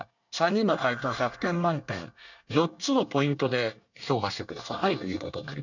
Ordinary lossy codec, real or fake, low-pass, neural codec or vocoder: none; fake; 7.2 kHz; codec, 24 kHz, 1 kbps, SNAC